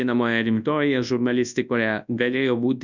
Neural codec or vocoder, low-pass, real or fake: codec, 24 kHz, 0.9 kbps, WavTokenizer, large speech release; 7.2 kHz; fake